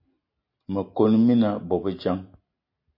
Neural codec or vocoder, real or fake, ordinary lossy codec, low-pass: none; real; MP3, 32 kbps; 5.4 kHz